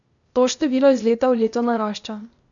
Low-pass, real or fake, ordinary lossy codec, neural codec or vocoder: 7.2 kHz; fake; none; codec, 16 kHz, 0.8 kbps, ZipCodec